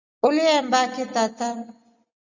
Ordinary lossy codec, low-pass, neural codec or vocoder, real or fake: Opus, 64 kbps; 7.2 kHz; none; real